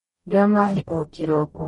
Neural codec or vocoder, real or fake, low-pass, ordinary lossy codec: codec, 44.1 kHz, 0.9 kbps, DAC; fake; 19.8 kHz; MP3, 48 kbps